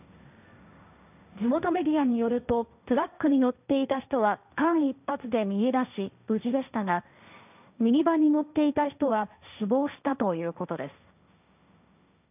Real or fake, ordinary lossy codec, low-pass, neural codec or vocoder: fake; none; 3.6 kHz; codec, 16 kHz, 1.1 kbps, Voila-Tokenizer